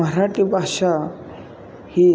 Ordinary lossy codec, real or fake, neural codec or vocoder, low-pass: none; real; none; none